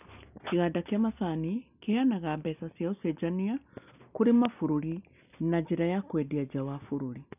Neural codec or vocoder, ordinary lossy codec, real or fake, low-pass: none; none; real; 3.6 kHz